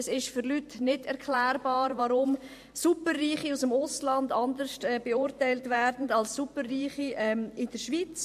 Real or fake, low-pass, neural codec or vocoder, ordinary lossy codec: fake; 14.4 kHz; vocoder, 44.1 kHz, 128 mel bands every 512 samples, BigVGAN v2; MP3, 64 kbps